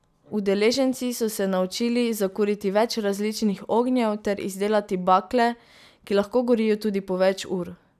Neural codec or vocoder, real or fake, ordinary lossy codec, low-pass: none; real; none; 14.4 kHz